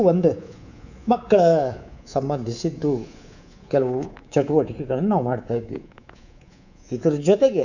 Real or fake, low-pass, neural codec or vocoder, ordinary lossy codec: fake; 7.2 kHz; codec, 24 kHz, 3.1 kbps, DualCodec; none